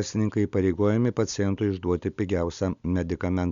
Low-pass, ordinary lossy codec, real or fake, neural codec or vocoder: 7.2 kHz; Opus, 64 kbps; real; none